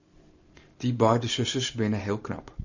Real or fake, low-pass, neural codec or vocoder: fake; 7.2 kHz; vocoder, 44.1 kHz, 128 mel bands every 512 samples, BigVGAN v2